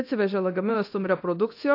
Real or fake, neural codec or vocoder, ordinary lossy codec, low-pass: fake; codec, 24 kHz, 0.9 kbps, DualCodec; AAC, 32 kbps; 5.4 kHz